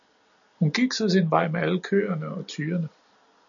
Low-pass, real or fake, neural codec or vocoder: 7.2 kHz; real; none